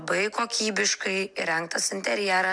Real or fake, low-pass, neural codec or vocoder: real; 9.9 kHz; none